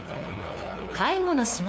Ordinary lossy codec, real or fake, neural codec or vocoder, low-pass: none; fake; codec, 16 kHz, 2 kbps, FunCodec, trained on LibriTTS, 25 frames a second; none